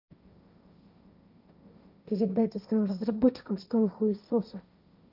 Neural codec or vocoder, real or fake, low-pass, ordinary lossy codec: codec, 16 kHz, 1.1 kbps, Voila-Tokenizer; fake; 5.4 kHz; none